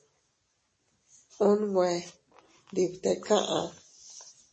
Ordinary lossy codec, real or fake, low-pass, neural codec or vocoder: MP3, 32 kbps; real; 10.8 kHz; none